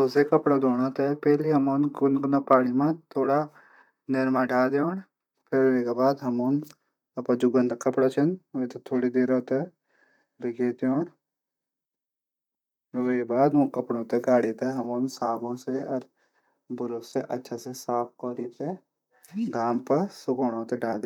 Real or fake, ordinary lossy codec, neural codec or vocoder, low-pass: fake; none; vocoder, 44.1 kHz, 128 mel bands, Pupu-Vocoder; 19.8 kHz